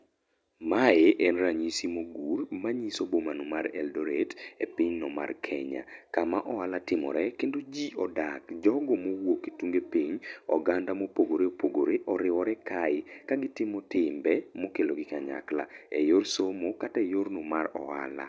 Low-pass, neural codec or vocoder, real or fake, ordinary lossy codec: none; none; real; none